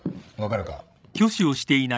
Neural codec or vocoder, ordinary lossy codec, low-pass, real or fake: codec, 16 kHz, 16 kbps, FreqCodec, larger model; none; none; fake